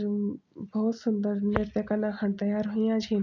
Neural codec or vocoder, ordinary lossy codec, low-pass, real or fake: none; none; 7.2 kHz; real